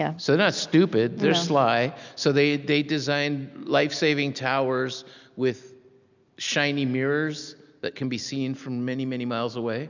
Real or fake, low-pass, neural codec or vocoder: real; 7.2 kHz; none